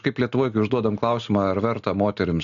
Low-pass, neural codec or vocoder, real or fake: 7.2 kHz; none; real